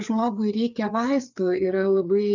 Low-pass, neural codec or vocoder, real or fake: 7.2 kHz; codec, 16 kHz, 4 kbps, FreqCodec, larger model; fake